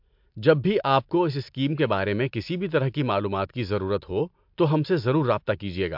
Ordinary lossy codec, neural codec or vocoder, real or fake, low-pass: MP3, 48 kbps; none; real; 5.4 kHz